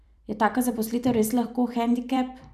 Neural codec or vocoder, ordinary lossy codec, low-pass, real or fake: vocoder, 44.1 kHz, 128 mel bands every 256 samples, BigVGAN v2; none; 14.4 kHz; fake